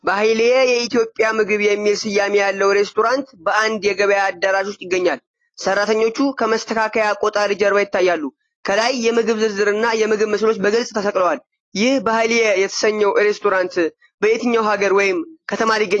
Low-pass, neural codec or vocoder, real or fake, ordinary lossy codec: 9.9 kHz; none; real; AAC, 32 kbps